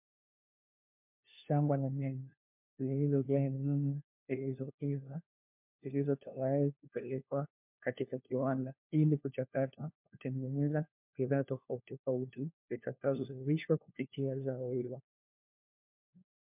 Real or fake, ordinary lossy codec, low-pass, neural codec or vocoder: fake; MP3, 32 kbps; 3.6 kHz; codec, 16 kHz, 1 kbps, FunCodec, trained on LibriTTS, 50 frames a second